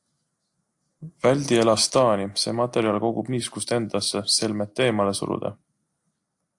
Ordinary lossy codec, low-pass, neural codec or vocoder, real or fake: AAC, 64 kbps; 10.8 kHz; none; real